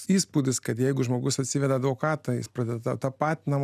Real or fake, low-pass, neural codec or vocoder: real; 14.4 kHz; none